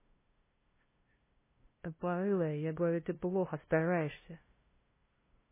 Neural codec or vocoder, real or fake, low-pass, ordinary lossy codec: codec, 16 kHz, 0.5 kbps, FunCodec, trained on LibriTTS, 25 frames a second; fake; 3.6 kHz; MP3, 16 kbps